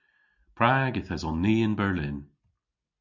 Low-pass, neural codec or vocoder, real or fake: 7.2 kHz; none; real